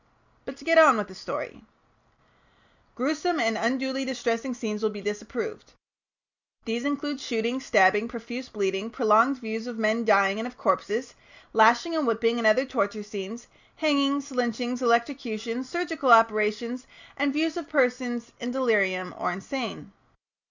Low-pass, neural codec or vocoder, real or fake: 7.2 kHz; none; real